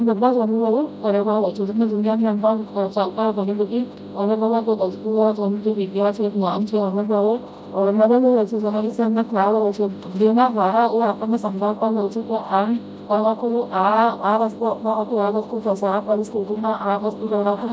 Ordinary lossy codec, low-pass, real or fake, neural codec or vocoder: none; none; fake; codec, 16 kHz, 0.5 kbps, FreqCodec, smaller model